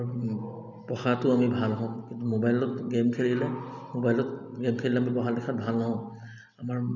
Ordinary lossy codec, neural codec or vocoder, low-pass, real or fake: none; none; 7.2 kHz; real